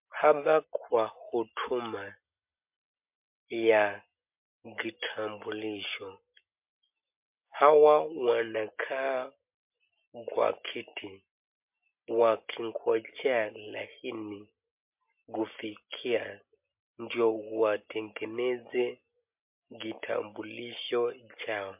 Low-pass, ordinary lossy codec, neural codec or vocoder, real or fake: 3.6 kHz; MP3, 32 kbps; none; real